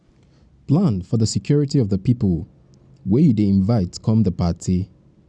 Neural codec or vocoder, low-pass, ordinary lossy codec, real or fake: none; 9.9 kHz; none; real